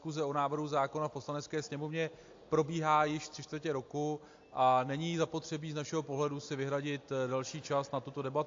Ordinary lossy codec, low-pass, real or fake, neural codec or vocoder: AAC, 48 kbps; 7.2 kHz; real; none